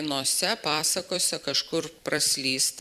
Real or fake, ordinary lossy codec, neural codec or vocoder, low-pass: fake; Opus, 64 kbps; vocoder, 44.1 kHz, 128 mel bands every 256 samples, BigVGAN v2; 14.4 kHz